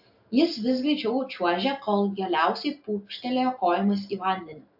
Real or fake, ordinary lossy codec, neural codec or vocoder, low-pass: real; AAC, 48 kbps; none; 5.4 kHz